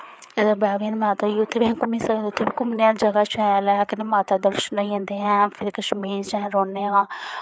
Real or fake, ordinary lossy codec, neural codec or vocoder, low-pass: fake; none; codec, 16 kHz, 4 kbps, FreqCodec, larger model; none